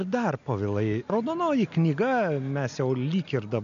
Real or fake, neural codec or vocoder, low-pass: real; none; 7.2 kHz